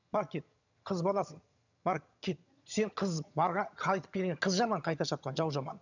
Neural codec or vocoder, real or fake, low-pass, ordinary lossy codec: vocoder, 22.05 kHz, 80 mel bands, HiFi-GAN; fake; 7.2 kHz; none